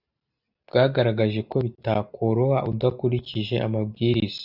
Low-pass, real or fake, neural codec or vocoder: 5.4 kHz; real; none